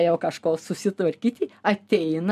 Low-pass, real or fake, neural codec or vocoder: 14.4 kHz; real; none